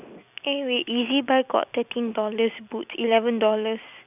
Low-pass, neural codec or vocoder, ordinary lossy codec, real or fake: 3.6 kHz; none; none; real